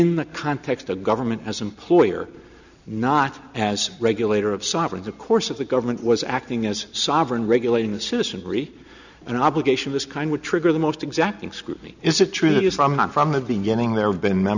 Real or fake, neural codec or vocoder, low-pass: real; none; 7.2 kHz